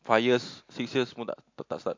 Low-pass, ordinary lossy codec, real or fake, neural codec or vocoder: 7.2 kHz; MP3, 48 kbps; real; none